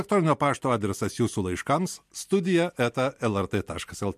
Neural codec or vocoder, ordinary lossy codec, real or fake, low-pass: none; MP3, 64 kbps; real; 14.4 kHz